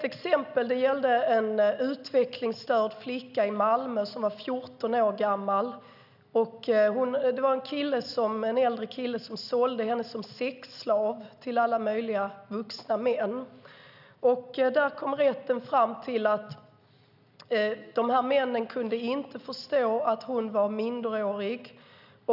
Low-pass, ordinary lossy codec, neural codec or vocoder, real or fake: 5.4 kHz; none; none; real